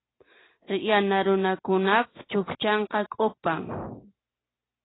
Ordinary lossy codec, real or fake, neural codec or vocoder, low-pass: AAC, 16 kbps; real; none; 7.2 kHz